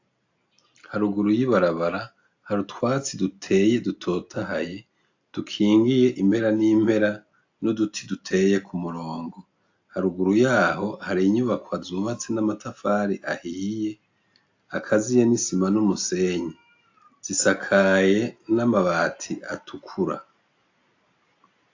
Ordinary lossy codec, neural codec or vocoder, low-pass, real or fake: AAC, 48 kbps; none; 7.2 kHz; real